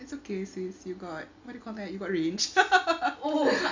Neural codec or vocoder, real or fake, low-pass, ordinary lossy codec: none; real; 7.2 kHz; MP3, 64 kbps